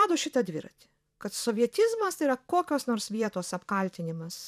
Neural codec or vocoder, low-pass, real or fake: vocoder, 44.1 kHz, 128 mel bands every 512 samples, BigVGAN v2; 14.4 kHz; fake